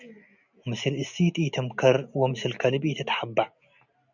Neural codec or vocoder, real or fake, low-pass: none; real; 7.2 kHz